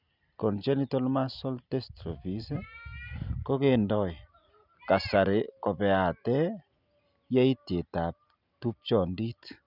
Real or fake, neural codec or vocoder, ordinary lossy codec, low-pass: real; none; none; 5.4 kHz